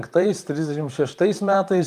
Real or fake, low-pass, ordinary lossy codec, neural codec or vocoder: fake; 14.4 kHz; Opus, 32 kbps; vocoder, 44.1 kHz, 128 mel bands, Pupu-Vocoder